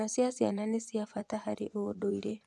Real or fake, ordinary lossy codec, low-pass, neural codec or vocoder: fake; none; none; vocoder, 24 kHz, 100 mel bands, Vocos